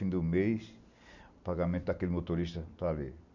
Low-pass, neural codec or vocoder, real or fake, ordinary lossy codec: 7.2 kHz; none; real; none